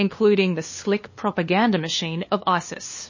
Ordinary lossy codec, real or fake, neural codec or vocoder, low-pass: MP3, 32 kbps; fake; codec, 16 kHz, 2 kbps, FunCodec, trained on LibriTTS, 25 frames a second; 7.2 kHz